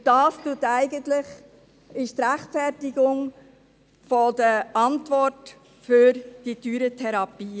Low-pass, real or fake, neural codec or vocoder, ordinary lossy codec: none; real; none; none